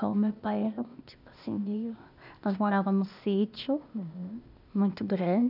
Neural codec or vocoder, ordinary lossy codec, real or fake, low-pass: codec, 16 kHz, 0.8 kbps, ZipCodec; none; fake; 5.4 kHz